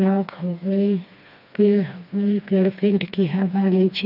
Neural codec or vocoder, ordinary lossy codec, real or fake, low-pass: codec, 16 kHz, 2 kbps, FreqCodec, smaller model; none; fake; 5.4 kHz